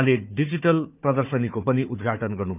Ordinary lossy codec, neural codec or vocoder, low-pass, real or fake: none; codec, 16 kHz, 4 kbps, FunCodec, trained on Chinese and English, 50 frames a second; 3.6 kHz; fake